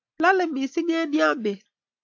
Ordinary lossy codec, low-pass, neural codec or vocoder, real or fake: AAC, 48 kbps; 7.2 kHz; none; real